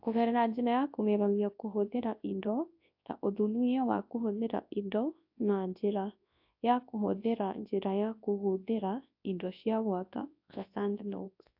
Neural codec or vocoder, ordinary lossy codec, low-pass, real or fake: codec, 24 kHz, 0.9 kbps, WavTokenizer, large speech release; AAC, 48 kbps; 5.4 kHz; fake